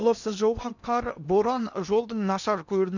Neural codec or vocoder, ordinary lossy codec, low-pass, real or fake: codec, 16 kHz in and 24 kHz out, 0.8 kbps, FocalCodec, streaming, 65536 codes; none; 7.2 kHz; fake